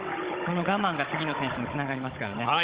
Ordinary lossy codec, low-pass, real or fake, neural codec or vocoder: Opus, 32 kbps; 3.6 kHz; fake; codec, 16 kHz, 16 kbps, FunCodec, trained on Chinese and English, 50 frames a second